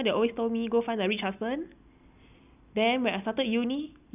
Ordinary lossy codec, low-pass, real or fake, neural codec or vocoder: none; 3.6 kHz; real; none